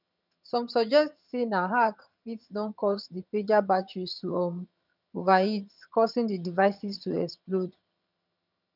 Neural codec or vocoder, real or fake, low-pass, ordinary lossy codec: vocoder, 22.05 kHz, 80 mel bands, HiFi-GAN; fake; 5.4 kHz; none